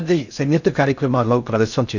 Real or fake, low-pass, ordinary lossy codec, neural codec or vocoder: fake; 7.2 kHz; none; codec, 16 kHz in and 24 kHz out, 0.6 kbps, FocalCodec, streaming, 4096 codes